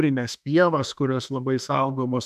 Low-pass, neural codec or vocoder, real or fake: 14.4 kHz; autoencoder, 48 kHz, 32 numbers a frame, DAC-VAE, trained on Japanese speech; fake